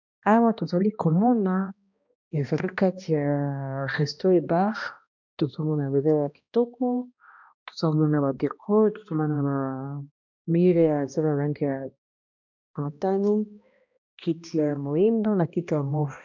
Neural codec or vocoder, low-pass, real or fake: codec, 16 kHz, 1 kbps, X-Codec, HuBERT features, trained on balanced general audio; 7.2 kHz; fake